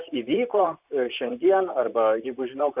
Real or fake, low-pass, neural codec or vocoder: fake; 3.6 kHz; vocoder, 44.1 kHz, 128 mel bands, Pupu-Vocoder